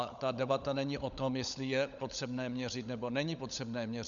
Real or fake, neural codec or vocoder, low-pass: fake; codec, 16 kHz, 16 kbps, FunCodec, trained on LibriTTS, 50 frames a second; 7.2 kHz